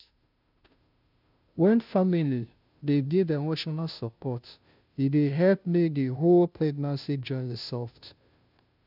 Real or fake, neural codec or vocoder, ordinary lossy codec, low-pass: fake; codec, 16 kHz, 0.5 kbps, FunCodec, trained on Chinese and English, 25 frames a second; none; 5.4 kHz